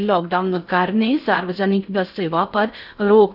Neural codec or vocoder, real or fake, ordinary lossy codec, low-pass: codec, 16 kHz in and 24 kHz out, 0.6 kbps, FocalCodec, streaming, 4096 codes; fake; none; 5.4 kHz